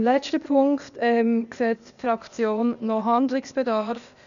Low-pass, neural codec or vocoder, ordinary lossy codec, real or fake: 7.2 kHz; codec, 16 kHz, 0.8 kbps, ZipCodec; none; fake